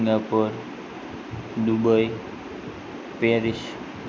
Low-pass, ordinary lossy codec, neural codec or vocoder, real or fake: none; none; none; real